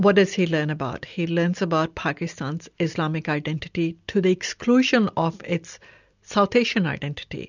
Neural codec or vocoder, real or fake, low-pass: none; real; 7.2 kHz